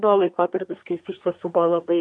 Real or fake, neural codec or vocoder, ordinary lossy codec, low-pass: fake; codec, 24 kHz, 1 kbps, SNAC; MP3, 96 kbps; 9.9 kHz